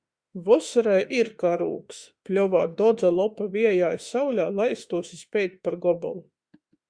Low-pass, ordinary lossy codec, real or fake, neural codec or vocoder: 9.9 kHz; Opus, 64 kbps; fake; autoencoder, 48 kHz, 32 numbers a frame, DAC-VAE, trained on Japanese speech